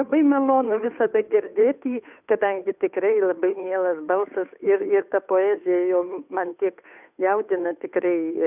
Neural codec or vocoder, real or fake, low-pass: codec, 16 kHz, 2 kbps, FunCodec, trained on Chinese and English, 25 frames a second; fake; 3.6 kHz